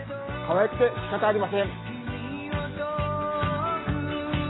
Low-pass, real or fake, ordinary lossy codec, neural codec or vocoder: 7.2 kHz; real; AAC, 16 kbps; none